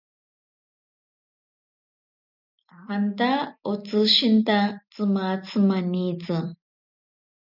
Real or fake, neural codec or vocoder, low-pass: real; none; 5.4 kHz